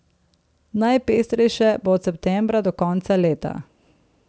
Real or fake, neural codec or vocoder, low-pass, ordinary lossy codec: real; none; none; none